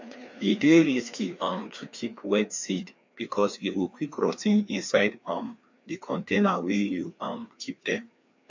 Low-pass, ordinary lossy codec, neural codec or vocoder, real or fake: 7.2 kHz; MP3, 48 kbps; codec, 16 kHz, 2 kbps, FreqCodec, larger model; fake